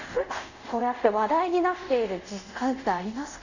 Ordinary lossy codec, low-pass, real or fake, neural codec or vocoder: none; 7.2 kHz; fake; codec, 24 kHz, 0.5 kbps, DualCodec